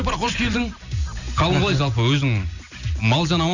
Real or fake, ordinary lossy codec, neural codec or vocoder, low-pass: real; none; none; 7.2 kHz